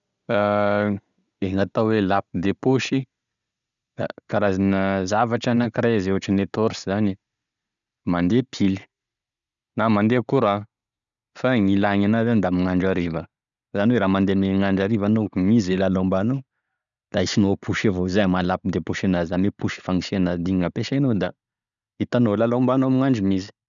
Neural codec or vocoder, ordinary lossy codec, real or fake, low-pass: none; none; real; 7.2 kHz